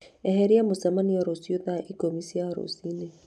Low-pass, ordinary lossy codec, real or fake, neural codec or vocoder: none; none; real; none